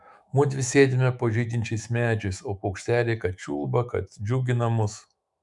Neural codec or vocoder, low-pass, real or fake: autoencoder, 48 kHz, 128 numbers a frame, DAC-VAE, trained on Japanese speech; 10.8 kHz; fake